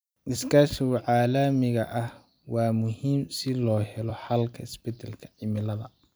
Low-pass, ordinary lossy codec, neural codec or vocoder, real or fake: none; none; vocoder, 44.1 kHz, 128 mel bands every 256 samples, BigVGAN v2; fake